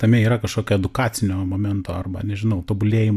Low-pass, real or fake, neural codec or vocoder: 14.4 kHz; real; none